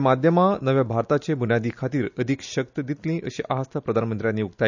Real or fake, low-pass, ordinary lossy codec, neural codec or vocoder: real; 7.2 kHz; none; none